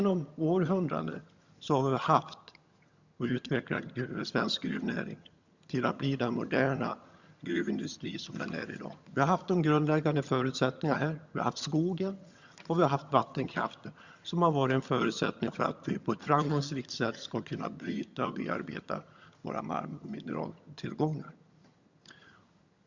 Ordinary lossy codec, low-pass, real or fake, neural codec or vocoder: Opus, 64 kbps; 7.2 kHz; fake; vocoder, 22.05 kHz, 80 mel bands, HiFi-GAN